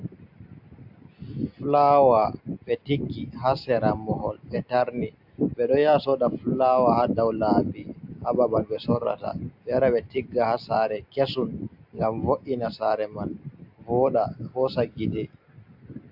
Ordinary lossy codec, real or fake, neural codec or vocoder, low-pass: AAC, 48 kbps; real; none; 5.4 kHz